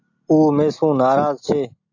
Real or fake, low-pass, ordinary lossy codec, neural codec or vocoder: real; 7.2 kHz; AAC, 48 kbps; none